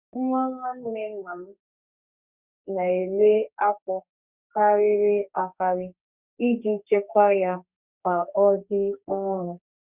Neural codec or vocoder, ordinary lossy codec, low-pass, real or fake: codec, 32 kHz, 1.9 kbps, SNAC; Opus, 64 kbps; 3.6 kHz; fake